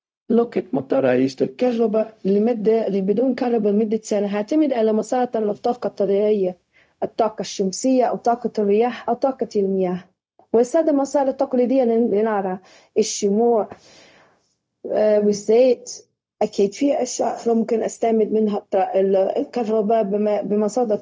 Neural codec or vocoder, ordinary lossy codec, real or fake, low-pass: codec, 16 kHz, 0.4 kbps, LongCat-Audio-Codec; none; fake; none